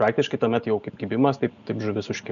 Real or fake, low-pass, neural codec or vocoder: real; 7.2 kHz; none